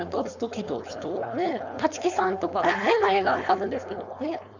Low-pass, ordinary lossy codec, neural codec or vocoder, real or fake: 7.2 kHz; none; codec, 16 kHz, 4.8 kbps, FACodec; fake